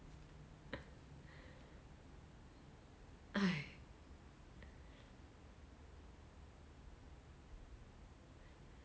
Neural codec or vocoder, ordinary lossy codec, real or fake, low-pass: none; none; real; none